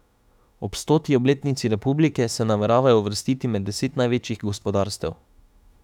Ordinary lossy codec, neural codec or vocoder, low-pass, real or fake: none; autoencoder, 48 kHz, 32 numbers a frame, DAC-VAE, trained on Japanese speech; 19.8 kHz; fake